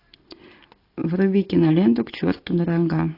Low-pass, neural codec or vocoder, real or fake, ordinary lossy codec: 5.4 kHz; none; real; AAC, 32 kbps